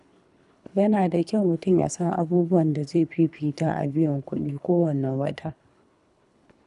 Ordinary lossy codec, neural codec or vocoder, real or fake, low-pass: none; codec, 24 kHz, 3 kbps, HILCodec; fake; 10.8 kHz